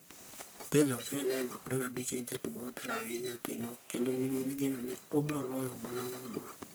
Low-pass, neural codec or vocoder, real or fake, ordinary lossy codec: none; codec, 44.1 kHz, 1.7 kbps, Pupu-Codec; fake; none